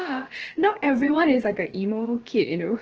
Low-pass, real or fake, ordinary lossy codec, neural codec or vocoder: 7.2 kHz; fake; Opus, 16 kbps; codec, 16 kHz, about 1 kbps, DyCAST, with the encoder's durations